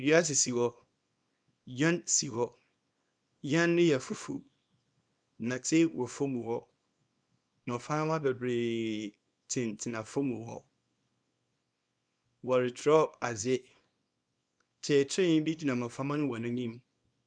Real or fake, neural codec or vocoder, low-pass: fake; codec, 24 kHz, 0.9 kbps, WavTokenizer, small release; 9.9 kHz